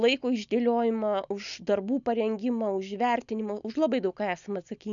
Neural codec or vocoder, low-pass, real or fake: none; 7.2 kHz; real